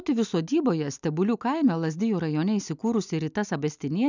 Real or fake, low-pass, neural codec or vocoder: real; 7.2 kHz; none